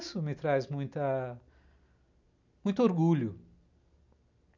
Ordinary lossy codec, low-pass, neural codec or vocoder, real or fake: none; 7.2 kHz; none; real